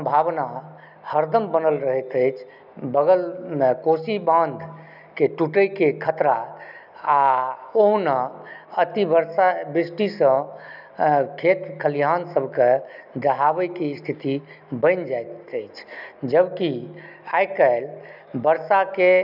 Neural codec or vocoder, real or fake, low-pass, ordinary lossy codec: none; real; 5.4 kHz; none